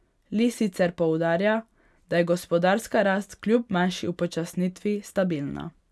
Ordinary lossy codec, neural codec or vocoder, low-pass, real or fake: none; none; none; real